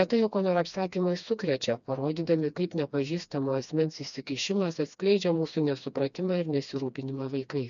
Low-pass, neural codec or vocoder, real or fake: 7.2 kHz; codec, 16 kHz, 2 kbps, FreqCodec, smaller model; fake